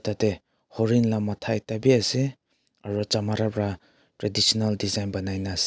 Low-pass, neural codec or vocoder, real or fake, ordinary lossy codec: none; none; real; none